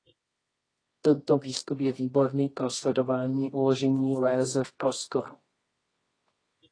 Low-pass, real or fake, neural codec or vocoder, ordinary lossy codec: 9.9 kHz; fake; codec, 24 kHz, 0.9 kbps, WavTokenizer, medium music audio release; AAC, 32 kbps